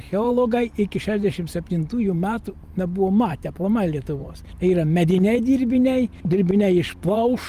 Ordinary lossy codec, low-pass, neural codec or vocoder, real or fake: Opus, 32 kbps; 14.4 kHz; vocoder, 44.1 kHz, 128 mel bands every 512 samples, BigVGAN v2; fake